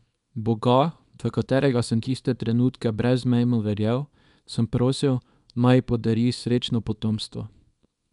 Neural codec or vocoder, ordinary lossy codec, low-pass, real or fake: codec, 24 kHz, 0.9 kbps, WavTokenizer, small release; none; 10.8 kHz; fake